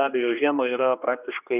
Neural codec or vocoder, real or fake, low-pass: codec, 16 kHz, 2 kbps, X-Codec, HuBERT features, trained on general audio; fake; 3.6 kHz